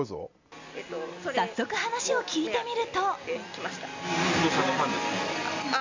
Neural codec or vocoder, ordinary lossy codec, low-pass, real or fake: none; MP3, 64 kbps; 7.2 kHz; real